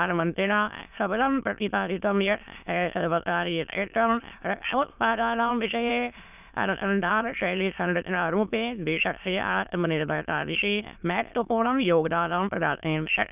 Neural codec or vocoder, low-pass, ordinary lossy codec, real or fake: autoencoder, 22.05 kHz, a latent of 192 numbers a frame, VITS, trained on many speakers; 3.6 kHz; none; fake